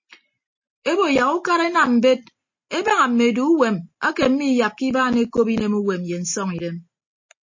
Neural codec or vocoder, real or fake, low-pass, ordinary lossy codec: none; real; 7.2 kHz; MP3, 32 kbps